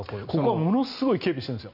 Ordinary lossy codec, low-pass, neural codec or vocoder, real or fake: none; 5.4 kHz; none; real